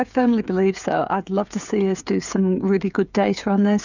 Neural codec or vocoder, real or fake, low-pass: codec, 16 kHz, 16 kbps, FreqCodec, smaller model; fake; 7.2 kHz